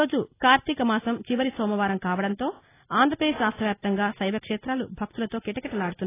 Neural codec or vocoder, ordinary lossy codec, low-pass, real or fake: none; AAC, 16 kbps; 3.6 kHz; real